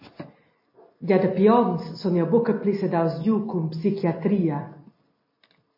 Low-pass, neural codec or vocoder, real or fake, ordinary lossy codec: 5.4 kHz; none; real; MP3, 24 kbps